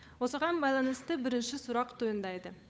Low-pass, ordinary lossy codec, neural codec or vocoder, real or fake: none; none; codec, 16 kHz, 8 kbps, FunCodec, trained on Chinese and English, 25 frames a second; fake